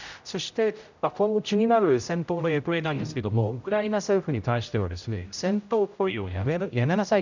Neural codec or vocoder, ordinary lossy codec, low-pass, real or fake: codec, 16 kHz, 0.5 kbps, X-Codec, HuBERT features, trained on general audio; none; 7.2 kHz; fake